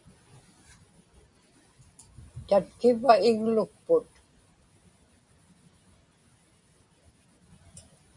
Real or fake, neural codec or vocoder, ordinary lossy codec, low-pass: real; none; MP3, 64 kbps; 10.8 kHz